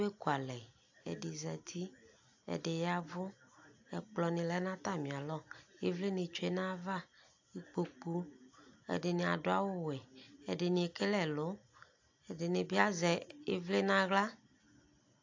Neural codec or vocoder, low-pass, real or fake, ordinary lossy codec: none; 7.2 kHz; real; AAC, 48 kbps